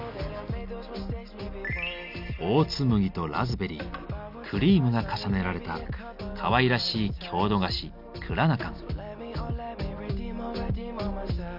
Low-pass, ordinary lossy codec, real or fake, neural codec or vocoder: 5.4 kHz; none; real; none